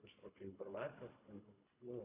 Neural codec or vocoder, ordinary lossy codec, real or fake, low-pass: codec, 24 kHz, 3 kbps, HILCodec; Opus, 64 kbps; fake; 3.6 kHz